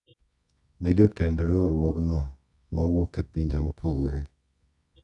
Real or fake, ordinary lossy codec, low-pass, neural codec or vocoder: fake; none; 10.8 kHz; codec, 24 kHz, 0.9 kbps, WavTokenizer, medium music audio release